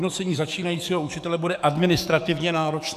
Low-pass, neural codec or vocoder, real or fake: 14.4 kHz; codec, 44.1 kHz, 7.8 kbps, DAC; fake